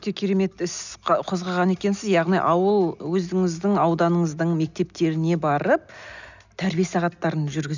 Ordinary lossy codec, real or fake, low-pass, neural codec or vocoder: none; real; 7.2 kHz; none